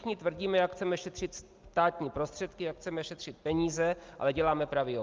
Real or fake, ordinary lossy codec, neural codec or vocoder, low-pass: real; Opus, 24 kbps; none; 7.2 kHz